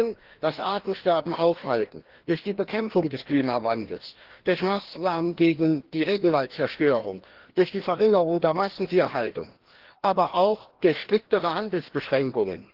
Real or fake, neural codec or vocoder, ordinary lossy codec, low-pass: fake; codec, 16 kHz, 1 kbps, FreqCodec, larger model; Opus, 16 kbps; 5.4 kHz